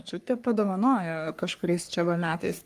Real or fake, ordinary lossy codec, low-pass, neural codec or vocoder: fake; Opus, 32 kbps; 14.4 kHz; codec, 44.1 kHz, 3.4 kbps, Pupu-Codec